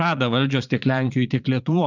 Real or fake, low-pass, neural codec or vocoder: fake; 7.2 kHz; vocoder, 22.05 kHz, 80 mel bands, Vocos